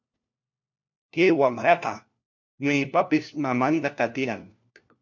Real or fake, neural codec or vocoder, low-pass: fake; codec, 16 kHz, 1 kbps, FunCodec, trained on LibriTTS, 50 frames a second; 7.2 kHz